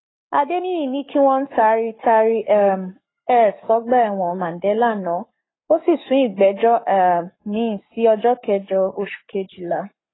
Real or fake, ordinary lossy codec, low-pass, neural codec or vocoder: fake; AAC, 16 kbps; 7.2 kHz; codec, 44.1 kHz, 7.8 kbps, Pupu-Codec